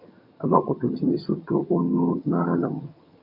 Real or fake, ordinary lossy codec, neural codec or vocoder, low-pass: fake; AAC, 48 kbps; vocoder, 22.05 kHz, 80 mel bands, HiFi-GAN; 5.4 kHz